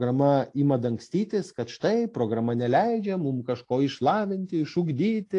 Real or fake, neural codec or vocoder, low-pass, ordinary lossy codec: real; none; 10.8 kHz; AAC, 48 kbps